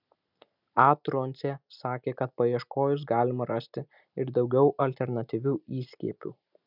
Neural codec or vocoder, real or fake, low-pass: none; real; 5.4 kHz